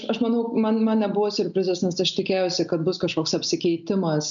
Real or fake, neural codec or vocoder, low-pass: real; none; 7.2 kHz